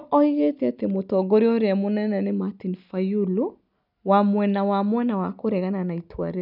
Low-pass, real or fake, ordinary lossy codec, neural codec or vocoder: 5.4 kHz; real; none; none